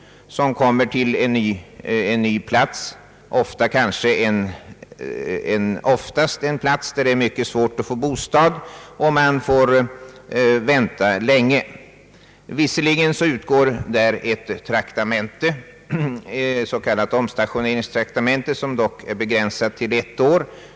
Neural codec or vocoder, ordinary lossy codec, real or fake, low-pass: none; none; real; none